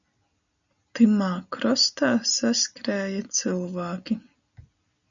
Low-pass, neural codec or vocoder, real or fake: 7.2 kHz; none; real